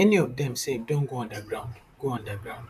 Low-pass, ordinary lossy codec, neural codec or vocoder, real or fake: 14.4 kHz; none; vocoder, 44.1 kHz, 128 mel bands, Pupu-Vocoder; fake